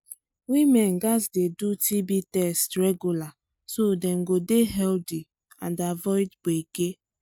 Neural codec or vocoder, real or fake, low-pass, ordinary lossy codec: none; real; none; none